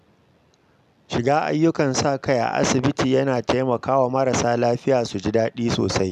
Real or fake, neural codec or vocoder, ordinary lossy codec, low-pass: real; none; none; 14.4 kHz